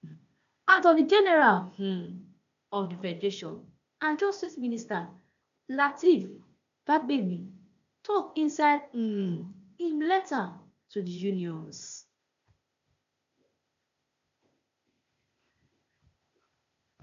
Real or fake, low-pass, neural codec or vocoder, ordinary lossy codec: fake; 7.2 kHz; codec, 16 kHz, 0.8 kbps, ZipCodec; none